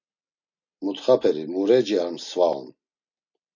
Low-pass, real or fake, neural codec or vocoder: 7.2 kHz; real; none